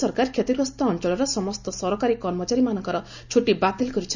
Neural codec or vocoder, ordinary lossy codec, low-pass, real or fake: none; none; 7.2 kHz; real